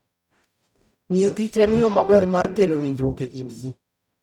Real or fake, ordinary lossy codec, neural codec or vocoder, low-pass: fake; none; codec, 44.1 kHz, 0.9 kbps, DAC; 19.8 kHz